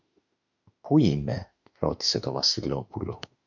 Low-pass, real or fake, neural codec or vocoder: 7.2 kHz; fake; autoencoder, 48 kHz, 32 numbers a frame, DAC-VAE, trained on Japanese speech